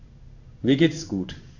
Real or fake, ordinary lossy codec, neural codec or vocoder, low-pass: fake; none; codec, 16 kHz in and 24 kHz out, 1 kbps, XY-Tokenizer; 7.2 kHz